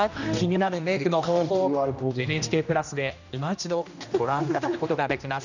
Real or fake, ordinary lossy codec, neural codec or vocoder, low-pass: fake; none; codec, 16 kHz, 1 kbps, X-Codec, HuBERT features, trained on general audio; 7.2 kHz